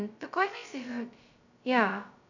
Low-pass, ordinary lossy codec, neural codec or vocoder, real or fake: 7.2 kHz; none; codec, 16 kHz, 0.2 kbps, FocalCodec; fake